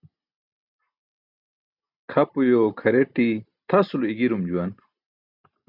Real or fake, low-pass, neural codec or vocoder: real; 5.4 kHz; none